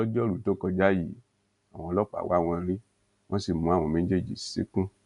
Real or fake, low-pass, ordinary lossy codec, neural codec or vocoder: real; 10.8 kHz; none; none